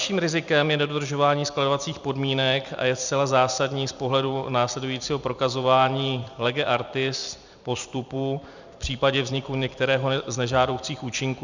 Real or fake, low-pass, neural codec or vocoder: fake; 7.2 kHz; vocoder, 44.1 kHz, 128 mel bands every 256 samples, BigVGAN v2